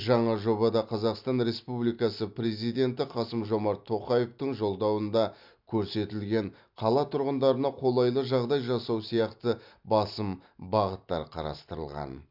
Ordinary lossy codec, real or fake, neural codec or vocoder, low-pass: MP3, 48 kbps; real; none; 5.4 kHz